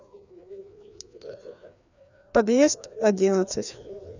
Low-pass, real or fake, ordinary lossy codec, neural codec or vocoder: 7.2 kHz; fake; none; codec, 16 kHz, 2 kbps, FreqCodec, larger model